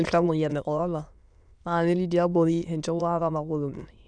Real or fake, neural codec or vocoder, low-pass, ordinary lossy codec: fake; autoencoder, 22.05 kHz, a latent of 192 numbers a frame, VITS, trained on many speakers; 9.9 kHz; none